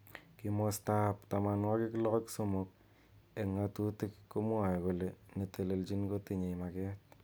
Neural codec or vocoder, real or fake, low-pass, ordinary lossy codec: none; real; none; none